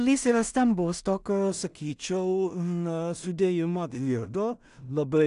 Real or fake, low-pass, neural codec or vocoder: fake; 10.8 kHz; codec, 16 kHz in and 24 kHz out, 0.4 kbps, LongCat-Audio-Codec, two codebook decoder